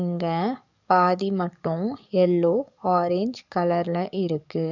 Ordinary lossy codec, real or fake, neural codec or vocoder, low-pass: none; fake; codec, 44.1 kHz, 7.8 kbps, DAC; 7.2 kHz